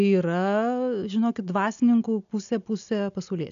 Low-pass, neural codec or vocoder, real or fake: 7.2 kHz; none; real